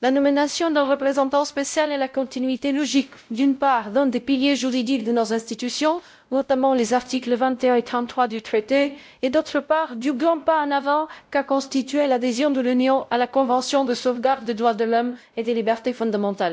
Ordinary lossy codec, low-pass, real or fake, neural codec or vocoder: none; none; fake; codec, 16 kHz, 0.5 kbps, X-Codec, WavLM features, trained on Multilingual LibriSpeech